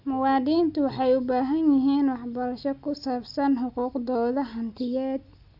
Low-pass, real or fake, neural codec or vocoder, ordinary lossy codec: 5.4 kHz; real; none; none